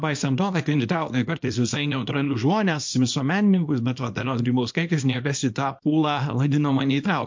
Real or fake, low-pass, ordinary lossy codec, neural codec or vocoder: fake; 7.2 kHz; MP3, 48 kbps; codec, 24 kHz, 0.9 kbps, WavTokenizer, small release